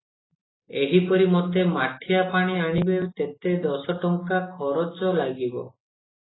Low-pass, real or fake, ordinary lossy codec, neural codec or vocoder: 7.2 kHz; real; AAC, 16 kbps; none